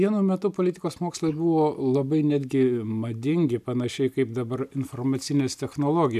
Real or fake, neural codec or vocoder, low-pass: real; none; 14.4 kHz